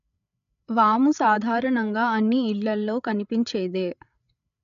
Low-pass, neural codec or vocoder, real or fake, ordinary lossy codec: 7.2 kHz; codec, 16 kHz, 8 kbps, FreqCodec, larger model; fake; none